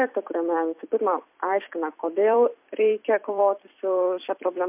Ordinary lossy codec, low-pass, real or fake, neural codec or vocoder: MP3, 32 kbps; 3.6 kHz; fake; vocoder, 44.1 kHz, 128 mel bands every 256 samples, BigVGAN v2